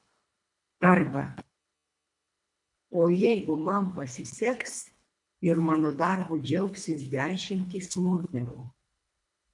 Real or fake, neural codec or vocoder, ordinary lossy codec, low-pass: fake; codec, 24 kHz, 1.5 kbps, HILCodec; MP3, 64 kbps; 10.8 kHz